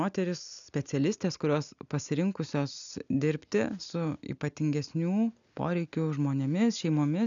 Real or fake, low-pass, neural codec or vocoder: real; 7.2 kHz; none